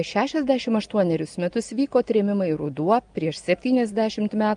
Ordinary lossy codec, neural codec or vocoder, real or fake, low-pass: Opus, 32 kbps; none; real; 9.9 kHz